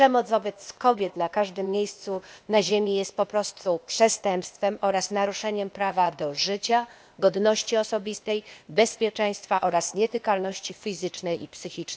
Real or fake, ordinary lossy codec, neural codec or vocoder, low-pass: fake; none; codec, 16 kHz, 0.8 kbps, ZipCodec; none